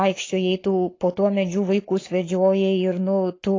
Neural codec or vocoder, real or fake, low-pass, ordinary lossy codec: codec, 44.1 kHz, 7.8 kbps, Pupu-Codec; fake; 7.2 kHz; AAC, 32 kbps